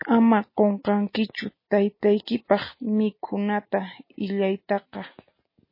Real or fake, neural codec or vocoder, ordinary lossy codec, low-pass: real; none; MP3, 24 kbps; 5.4 kHz